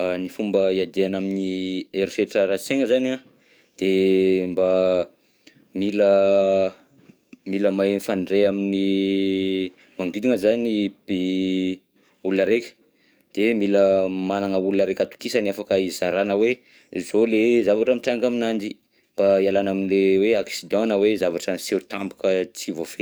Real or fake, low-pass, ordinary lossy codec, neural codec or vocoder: fake; none; none; codec, 44.1 kHz, 7.8 kbps, DAC